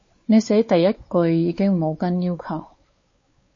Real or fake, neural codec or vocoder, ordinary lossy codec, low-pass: fake; codec, 16 kHz, 4 kbps, X-Codec, WavLM features, trained on Multilingual LibriSpeech; MP3, 32 kbps; 7.2 kHz